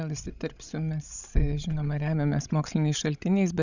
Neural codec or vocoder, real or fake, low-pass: codec, 16 kHz, 16 kbps, FreqCodec, larger model; fake; 7.2 kHz